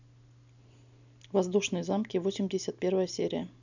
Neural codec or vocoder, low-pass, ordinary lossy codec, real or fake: none; 7.2 kHz; none; real